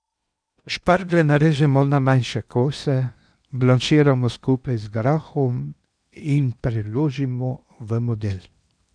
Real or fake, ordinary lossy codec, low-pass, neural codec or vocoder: fake; none; 9.9 kHz; codec, 16 kHz in and 24 kHz out, 0.8 kbps, FocalCodec, streaming, 65536 codes